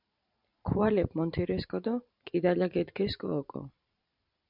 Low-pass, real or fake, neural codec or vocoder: 5.4 kHz; fake; vocoder, 44.1 kHz, 128 mel bands every 512 samples, BigVGAN v2